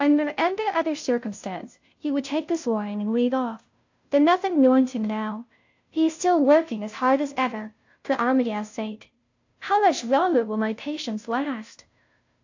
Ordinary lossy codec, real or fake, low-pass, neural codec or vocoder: AAC, 48 kbps; fake; 7.2 kHz; codec, 16 kHz, 0.5 kbps, FunCodec, trained on Chinese and English, 25 frames a second